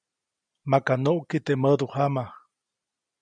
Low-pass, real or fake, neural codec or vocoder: 9.9 kHz; real; none